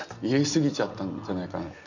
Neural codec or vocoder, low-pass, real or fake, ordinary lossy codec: none; 7.2 kHz; real; none